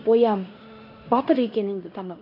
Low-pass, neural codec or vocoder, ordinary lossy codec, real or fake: 5.4 kHz; codec, 16 kHz in and 24 kHz out, 0.9 kbps, LongCat-Audio-Codec, fine tuned four codebook decoder; none; fake